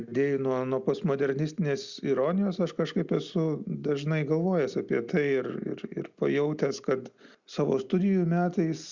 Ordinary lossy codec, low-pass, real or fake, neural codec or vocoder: Opus, 64 kbps; 7.2 kHz; real; none